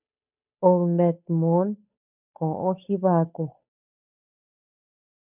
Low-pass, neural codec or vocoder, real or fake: 3.6 kHz; codec, 16 kHz, 8 kbps, FunCodec, trained on Chinese and English, 25 frames a second; fake